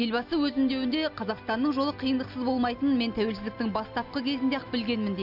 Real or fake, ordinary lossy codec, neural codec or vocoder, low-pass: real; none; none; 5.4 kHz